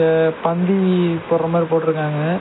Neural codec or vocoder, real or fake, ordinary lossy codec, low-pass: none; real; AAC, 16 kbps; 7.2 kHz